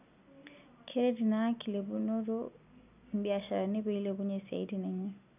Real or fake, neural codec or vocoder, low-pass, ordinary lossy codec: real; none; 3.6 kHz; none